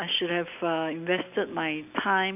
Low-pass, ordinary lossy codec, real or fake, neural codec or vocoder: 3.6 kHz; none; real; none